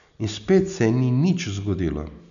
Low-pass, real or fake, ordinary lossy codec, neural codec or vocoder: 7.2 kHz; real; none; none